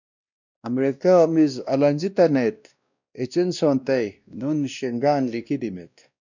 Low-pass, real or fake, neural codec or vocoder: 7.2 kHz; fake; codec, 16 kHz, 1 kbps, X-Codec, WavLM features, trained on Multilingual LibriSpeech